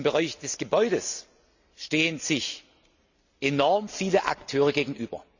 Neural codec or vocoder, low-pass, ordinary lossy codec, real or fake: none; 7.2 kHz; none; real